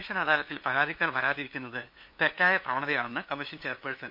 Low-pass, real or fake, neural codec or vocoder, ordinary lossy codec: 5.4 kHz; fake; codec, 16 kHz, 2 kbps, FunCodec, trained on LibriTTS, 25 frames a second; MP3, 32 kbps